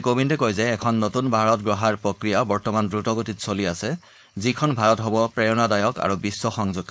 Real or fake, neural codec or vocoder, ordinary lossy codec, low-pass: fake; codec, 16 kHz, 4.8 kbps, FACodec; none; none